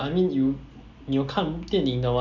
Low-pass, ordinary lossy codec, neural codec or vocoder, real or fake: 7.2 kHz; none; none; real